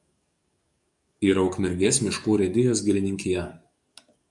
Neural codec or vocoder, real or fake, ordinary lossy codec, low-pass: codec, 44.1 kHz, 7.8 kbps, DAC; fake; MP3, 96 kbps; 10.8 kHz